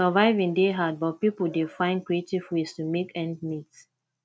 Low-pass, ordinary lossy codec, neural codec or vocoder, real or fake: none; none; none; real